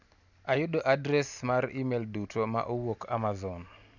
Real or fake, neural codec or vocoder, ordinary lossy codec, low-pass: real; none; none; 7.2 kHz